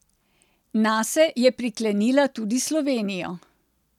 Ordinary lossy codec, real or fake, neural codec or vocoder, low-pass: none; real; none; 19.8 kHz